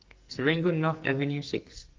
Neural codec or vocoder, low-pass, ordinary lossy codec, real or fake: codec, 44.1 kHz, 2.6 kbps, SNAC; 7.2 kHz; Opus, 32 kbps; fake